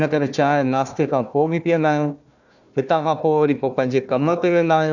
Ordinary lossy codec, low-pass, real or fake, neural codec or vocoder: none; 7.2 kHz; fake; codec, 16 kHz, 1 kbps, FunCodec, trained on Chinese and English, 50 frames a second